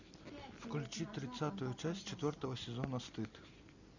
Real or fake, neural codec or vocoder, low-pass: real; none; 7.2 kHz